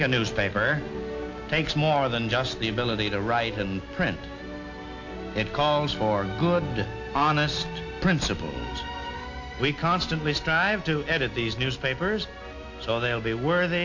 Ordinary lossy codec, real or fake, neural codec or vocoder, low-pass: AAC, 48 kbps; real; none; 7.2 kHz